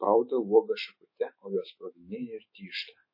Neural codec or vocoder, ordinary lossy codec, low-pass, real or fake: autoencoder, 48 kHz, 128 numbers a frame, DAC-VAE, trained on Japanese speech; MP3, 24 kbps; 5.4 kHz; fake